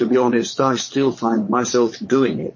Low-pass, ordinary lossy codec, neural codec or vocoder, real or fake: 7.2 kHz; MP3, 32 kbps; vocoder, 22.05 kHz, 80 mel bands, Vocos; fake